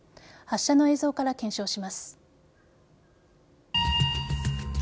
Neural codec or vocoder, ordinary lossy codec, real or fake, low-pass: none; none; real; none